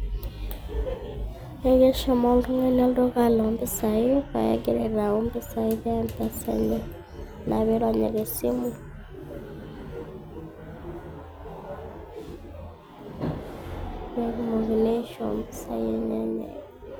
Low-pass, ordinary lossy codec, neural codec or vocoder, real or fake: none; none; none; real